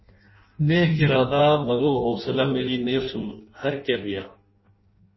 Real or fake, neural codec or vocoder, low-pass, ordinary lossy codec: fake; codec, 16 kHz in and 24 kHz out, 0.6 kbps, FireRedTTS-2 codec; 7.2 kHz; MP3, 24 kbps